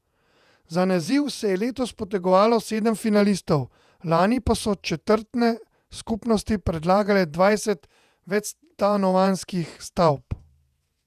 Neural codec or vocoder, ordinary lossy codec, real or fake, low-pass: vocoder, 48 kHz, 128 mel bands, Vocos; none; fake; 14.4 kHz